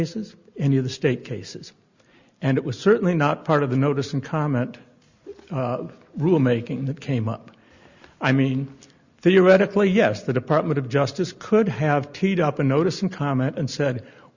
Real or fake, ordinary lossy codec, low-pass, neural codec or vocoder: real; Opus, 64 kbps; 7.2 kHz; none